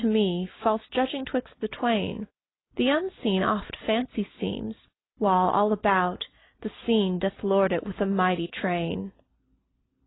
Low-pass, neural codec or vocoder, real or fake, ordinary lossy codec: 7.2 kHz; none; real; AAC, 16 kbps